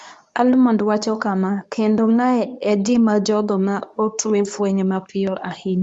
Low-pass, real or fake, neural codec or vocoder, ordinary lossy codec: none; fake; codec, 24 kHz, 0.9 kbps, WavTokenizer, medium speech release version 2; none